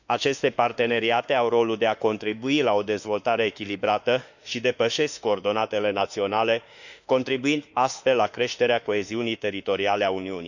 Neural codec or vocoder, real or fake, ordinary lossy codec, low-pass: autoencoder, 48 kHz, 32 numbers a frame, DAC-VAE, trained on Japanese speech; fake; none; 7.2 kHz